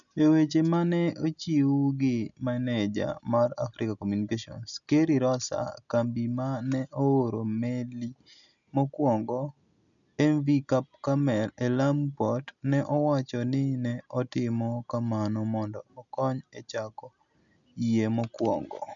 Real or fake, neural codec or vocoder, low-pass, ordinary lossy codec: real; none; 7.2 kHz; none